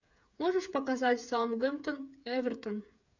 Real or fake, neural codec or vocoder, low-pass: fake; codec, 16 kHz, 8 kbps, FreqCodec, smaller model; 7.2 kHz